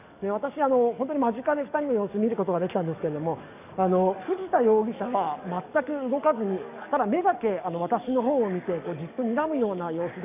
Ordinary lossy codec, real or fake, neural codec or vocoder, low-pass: none; fake; codec, 44.1 kHz, 7.8 kbps, DAC; 3.6 kHz